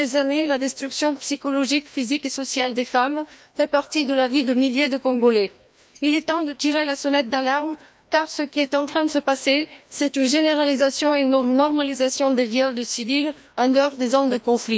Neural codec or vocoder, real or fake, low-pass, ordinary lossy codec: codec, 16 kHz, 1 kbps, FreqCodec, larger model; fake; none; none